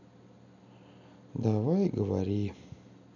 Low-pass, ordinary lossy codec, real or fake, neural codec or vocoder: 7.2 kHz; none; real; none